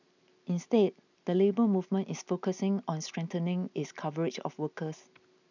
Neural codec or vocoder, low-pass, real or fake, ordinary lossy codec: none; 7.2 kHz; real; none